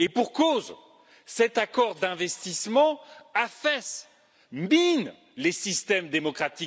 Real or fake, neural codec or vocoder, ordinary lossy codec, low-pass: real; none; none; none